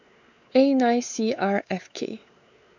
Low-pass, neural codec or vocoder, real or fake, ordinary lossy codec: 7.2 kHz; codec, 16 kHz, 4 kbps, X-Codec, WavLM features, trained on Multilingual LibriSpeech; fake; none